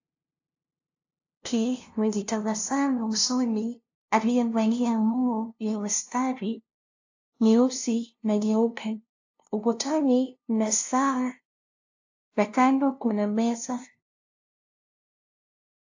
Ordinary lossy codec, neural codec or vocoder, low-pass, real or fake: AAC, 48 kbps; codec, 16 kHz, 0.5 kbps, FunCodec, trained on LibriTTS, 25 frames a second; 7.2 kHz; fake